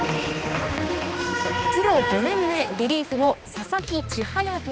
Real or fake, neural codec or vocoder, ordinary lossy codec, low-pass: fake; codec, 16 kHz, 2 kbps, X-Codec, HuBERT features, trained on balanced general audio; none; none